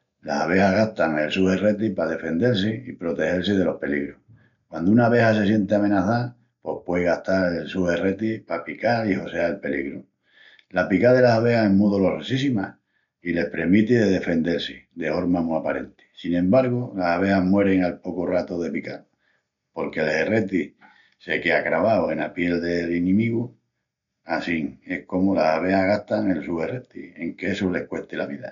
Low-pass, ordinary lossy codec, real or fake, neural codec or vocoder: 7.2 kHz; none; real; none